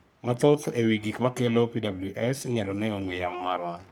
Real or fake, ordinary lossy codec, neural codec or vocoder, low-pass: fake; none; codec, 44.1 kHz, 3.4 kbps, Pupu-Codec; none